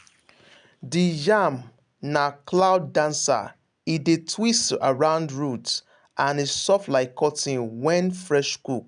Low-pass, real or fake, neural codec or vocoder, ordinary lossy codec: 9.9 kHz; real; none; none